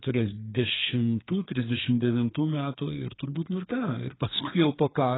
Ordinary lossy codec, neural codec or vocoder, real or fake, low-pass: AAC, 16 kbps; codec, 32 kHz, 1.9 kbps, SNAC; fake; 7.2 kHz